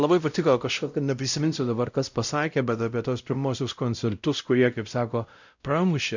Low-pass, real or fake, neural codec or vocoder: 7.2 kHz; fake; codec, 16 kHz, 0.5 kbps, X-Codec, WavLM features, trained on Multilingual LibriSpeech